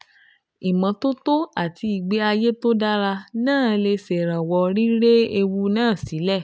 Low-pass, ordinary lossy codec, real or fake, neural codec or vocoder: none; none; real; none